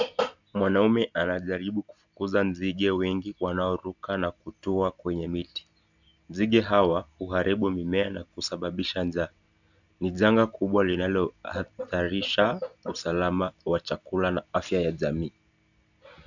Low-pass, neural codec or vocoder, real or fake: 7.2 kHz; none; real